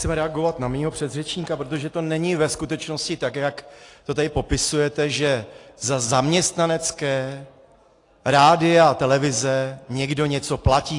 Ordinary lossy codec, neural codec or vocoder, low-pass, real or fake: AAC, 48 kbps; none; 10.8 kHz; real